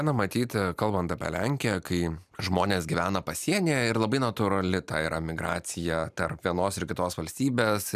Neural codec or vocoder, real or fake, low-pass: none; real; 14.4 kHz